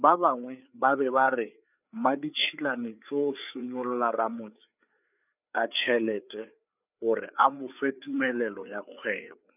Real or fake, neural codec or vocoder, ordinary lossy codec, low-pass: fake; codec, 16 kHz, 4 kbps, FreqCodec, larger model; none; 3.6 kHz